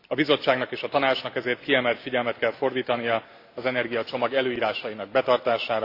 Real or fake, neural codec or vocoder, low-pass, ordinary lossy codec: real; none; 5.4 kHz; AAC, 32 kbps